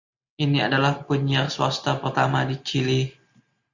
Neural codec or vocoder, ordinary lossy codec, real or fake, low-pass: none; Opus, 64 kbps; real; 7.2 kHz